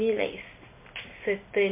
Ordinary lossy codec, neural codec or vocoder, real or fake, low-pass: MP3, 24 kbps; none; real; 3.6 kHz